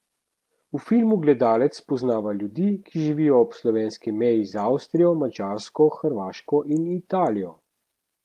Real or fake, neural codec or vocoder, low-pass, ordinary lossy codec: real; none; 14.4 kHz; Opus, 24 kbps